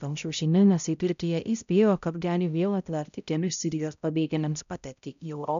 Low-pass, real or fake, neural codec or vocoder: 7.2 kHz; fake; codec, 16 kHz, 0.5 kbps, X-Codec, HuBERT features, trained on balanced general audio